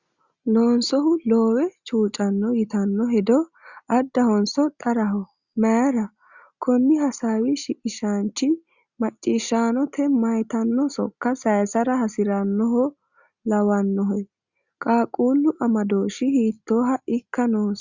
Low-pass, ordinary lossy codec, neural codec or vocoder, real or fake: 7.2 kHz; Opus, 64 kbps; none; real